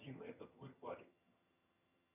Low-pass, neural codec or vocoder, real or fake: 3.6 kHz; vocoder, 22.05 kHz, 80 mel bands, HiFi-GAN; fake